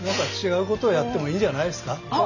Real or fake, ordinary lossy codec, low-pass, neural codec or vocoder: real; none; 7.2 kHz; none